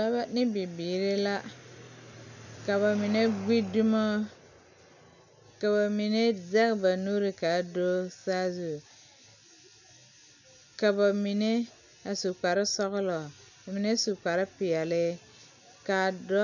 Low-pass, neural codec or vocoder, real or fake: 7.2 kHz; none; real